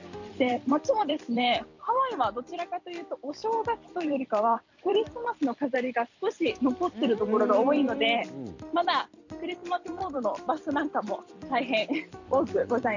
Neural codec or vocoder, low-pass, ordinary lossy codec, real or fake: vocoder, 44.1 kHz, 128 mel bands every 256 samples, BigVGAN v2; 7.2 kHz; none; fake